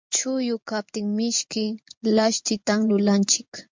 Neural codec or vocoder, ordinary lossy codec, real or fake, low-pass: vocoder, 24 kHz, 100 mel bands, Vocos; MP3, 64 kbps; fake; 7.2 kHz